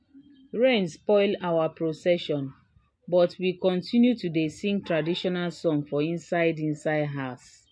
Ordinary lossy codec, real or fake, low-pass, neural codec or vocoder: MP3, 48 kbps; real; 9.9 kHz; none